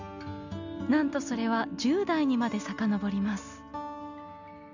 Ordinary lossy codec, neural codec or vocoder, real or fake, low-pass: none; none; real; 7.2 kHz